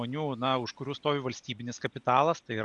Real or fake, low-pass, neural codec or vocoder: real; 10.8 kHz; none